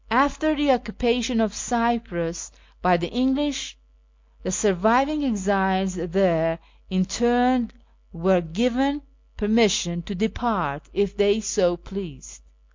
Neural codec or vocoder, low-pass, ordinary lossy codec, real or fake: none; 7.2 kHz; MP3, 48 kbps; real